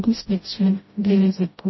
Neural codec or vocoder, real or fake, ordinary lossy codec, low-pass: codec, 16 kHz, 0.5 kbps, FreqCodec, smaller model; fake; MP3, 24 kbps; 7.2 kHz